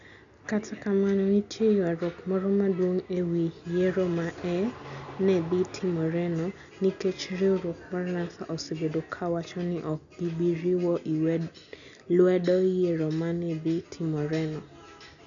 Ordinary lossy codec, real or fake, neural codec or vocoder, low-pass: none; real; none; 7.2 kHz